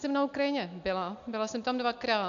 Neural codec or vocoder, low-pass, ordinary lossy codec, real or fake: none; 7.2 kHz; MP3, 64 kbps; real